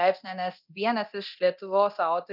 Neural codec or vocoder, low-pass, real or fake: codec, 24 kHz, 0.9 kbps, DualCodec; 5.4 kHz; fake